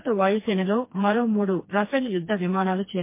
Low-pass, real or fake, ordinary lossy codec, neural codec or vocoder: 3.6 kHz; fake; MP3, 24 kbps; codec, 16 kHz, 2 kbps, FreqCodec, smaller model